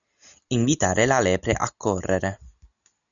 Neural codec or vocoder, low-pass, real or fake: none; 7.2 kHz; real